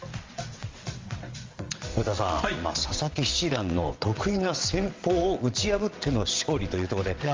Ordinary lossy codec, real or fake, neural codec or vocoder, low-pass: Opus, 32 kbps; fake; vocoder, 22.05 kHz, 80 mel bands, WaveNeXt; 7.2 kHz